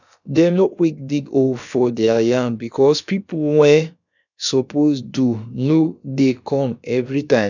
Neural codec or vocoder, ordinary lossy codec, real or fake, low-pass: codec, 16 kHz, about 1 kbps, DyCAST, with the encoder's durations; none; fake; 7.2 kHz